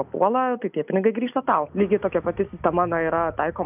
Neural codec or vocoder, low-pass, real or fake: none; 3.6 kHz; real